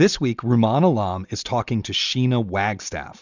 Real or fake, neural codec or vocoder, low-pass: real; none; 7.2 kHz